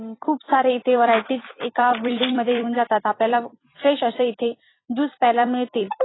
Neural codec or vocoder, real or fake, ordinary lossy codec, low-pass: vocoder, 44.1 kHz, 128 mel bands every 256 samples, BigVGAN v2; fake; AAC, 16 kbps; 7.2 kHz